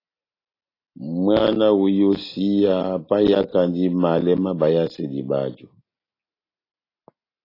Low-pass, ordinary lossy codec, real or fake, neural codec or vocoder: 5.4 kHz; AAC, 24 kbps; real; none